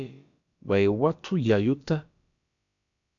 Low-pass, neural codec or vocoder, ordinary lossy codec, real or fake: 7.2 kHz; codec, 16 kHz, about 1 kbps, DyCAST, with the encoder's durations; MP3, 96 kbps; fake